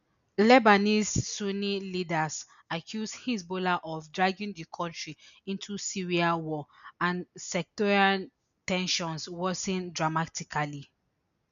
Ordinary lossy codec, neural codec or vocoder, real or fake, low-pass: none; none; real; 7.2 kHz